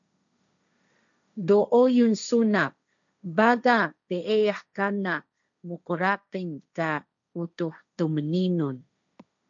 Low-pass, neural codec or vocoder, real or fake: 7.2 kHz; codec, 16 kHz, 1.1 kbps, Voila-Tokenizer; fake